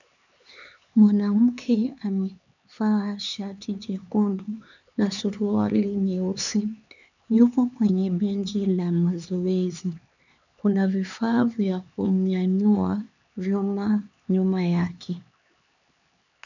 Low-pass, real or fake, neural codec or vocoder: 7.2 kHz; fake; codec, 16 kHz, 4 kbps, X-Codec, HuBERT features, trained on LibriSpeech